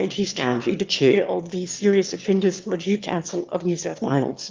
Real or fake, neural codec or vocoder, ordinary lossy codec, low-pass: fake; autoencoder, 22.05 kHz, a latent of 192 numbers a frame, VITS, trained on one speaker; Opus, 32 kbps; 7.2 kHz